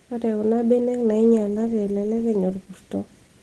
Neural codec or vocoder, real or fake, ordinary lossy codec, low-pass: none; real; Opus, 16 kbps; 10.8 kHz